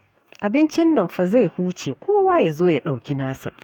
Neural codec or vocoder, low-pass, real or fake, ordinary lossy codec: codec, 44.1 kHz, 2.6 kbps, DAC; 19.8 kHz; fake; none